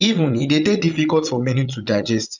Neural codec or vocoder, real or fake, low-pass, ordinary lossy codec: vocoder, 22.05 kHz, 80 mel bands, Vocos; fake; 7.2 kHz; none